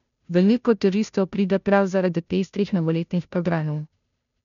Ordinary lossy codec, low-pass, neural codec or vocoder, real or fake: none; 7.2 kHz; codec, 16 kHz, 0.5 kbps, FunCodec, trained on Chinese and English, 25 frames a second; fake